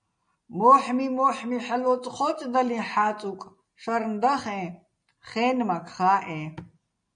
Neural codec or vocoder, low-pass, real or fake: none; 9.9 kHz; real